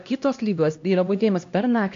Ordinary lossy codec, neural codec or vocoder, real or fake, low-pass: AAC, 48 kbps; codec, 16 kHz, 1 kbps, X-Codec, HuBERT features, trained on LibriSpeech; fake; 7.2 kHz